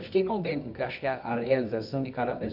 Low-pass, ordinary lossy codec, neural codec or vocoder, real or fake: 5.4 kHz; none; codec, 24 kHz, 0.9 kbps, WavTokenizer, medium music audio release; fake